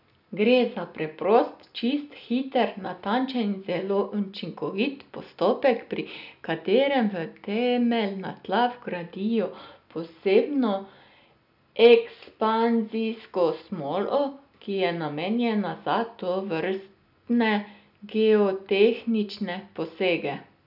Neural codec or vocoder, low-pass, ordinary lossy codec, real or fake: none; 5.4 kHz; none; real